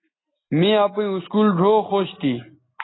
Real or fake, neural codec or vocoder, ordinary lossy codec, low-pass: real; none; AAC, 16 kbps; 7.2 kHz